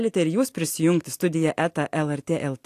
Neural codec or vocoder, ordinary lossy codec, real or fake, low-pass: none; AAC, 64 kbps; real; 14.4 kHz